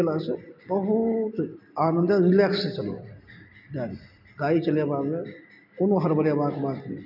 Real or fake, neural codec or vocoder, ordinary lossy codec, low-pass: real; none; none; 5.4 kHz